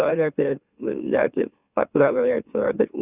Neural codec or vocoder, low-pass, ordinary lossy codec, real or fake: autoencoder, 44.1 kHz, a latent of 192 numbers a frame, MeloTTS; 3.6 kHz; Opus, 64 kbps; fake